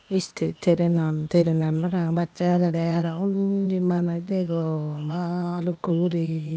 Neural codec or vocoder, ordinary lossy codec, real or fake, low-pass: codec, 16 kHz, 0.8 kbps, ZipCodec; none; fake; none